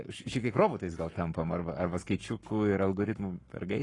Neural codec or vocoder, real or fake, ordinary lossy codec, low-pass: codec, 44.1 kHz, 7.8 kbps, Pupu-Codec; fake; AAC, 32 kbps; 10.8 kHz